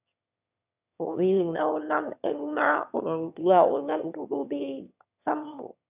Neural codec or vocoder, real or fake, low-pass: autoencoder, 22.05 kHz, a latent of 192 numbers a frame, VITS, trained on one speaker; fake; 3.6 kHz